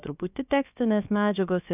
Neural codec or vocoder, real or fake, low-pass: codec, 16 kHz, about 1 kbps, DyCAST, with the encoder's durations; fake; 3.6 kHz